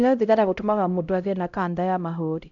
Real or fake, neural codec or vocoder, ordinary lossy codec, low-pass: fake; codec, 16 kHz, 0.5 kbps, X-Codec, HuBERT features, trained on LibriSpeech; none; 7.2 kHz